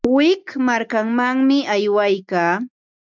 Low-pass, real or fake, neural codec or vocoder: 7.2 kHz; real; none